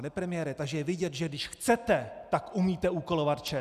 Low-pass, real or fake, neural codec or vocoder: 14.4 kHz; real; none